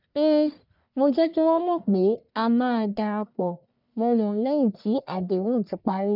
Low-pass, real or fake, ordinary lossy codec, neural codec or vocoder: 5.4 kHz; fake; none; codec, 44.1 kHz, 1.7 kbps, Pupu-Codec